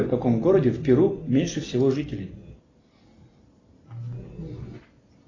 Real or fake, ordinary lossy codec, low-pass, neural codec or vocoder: real; AAC, 32 kbps; 7.2 kHz; none